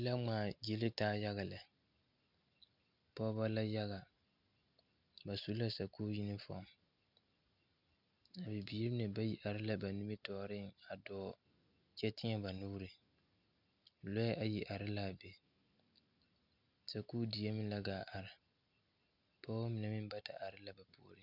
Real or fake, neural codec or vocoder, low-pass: real; none; 5.4 kHz